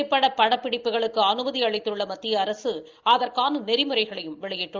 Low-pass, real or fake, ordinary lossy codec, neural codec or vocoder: 7.2 kHz; real; Opus, 24 kbps; none